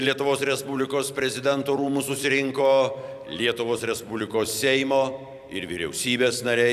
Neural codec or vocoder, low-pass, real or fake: vocoder, 44.1 kHz, 128 mel bands every 256 samples, BigVGAN v2; 14.4 kHz; fake